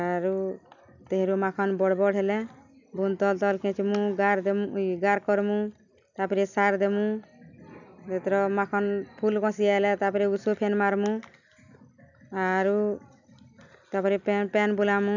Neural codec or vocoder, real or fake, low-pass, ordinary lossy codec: none; real; 7.2 kHz; none